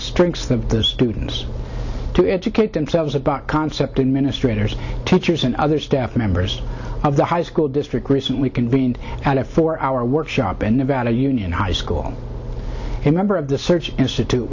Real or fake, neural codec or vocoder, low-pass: real; none; 7.2 kHz